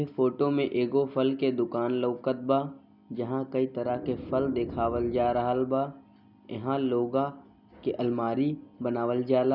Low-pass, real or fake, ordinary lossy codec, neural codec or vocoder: 5.4 kHz; real; none; none